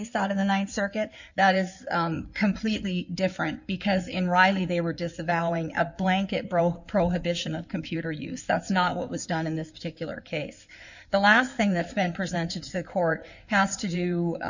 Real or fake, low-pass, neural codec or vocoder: fake; 7.2 kHz; codec, 16 kHz in and 24 kHz out, 2.2 kbps, FireRedTTS-2 codec